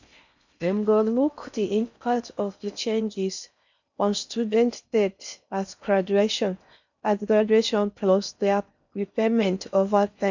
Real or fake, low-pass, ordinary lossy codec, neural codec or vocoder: fake; 7.2 kHz; none; codec, 16 kHz in and 24 kHz out, 0.6 kbps, FocalCodec, streaming, 4096 codes